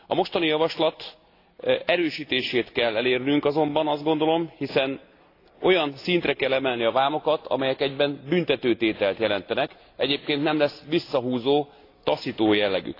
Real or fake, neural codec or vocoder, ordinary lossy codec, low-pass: real; none; AAC, 32 kbps; 5.4 kHz